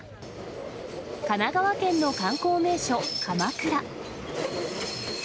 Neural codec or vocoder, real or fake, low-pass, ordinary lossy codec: none; real; none; none